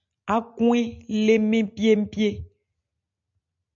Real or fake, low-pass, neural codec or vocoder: real; 7.2 kHz; none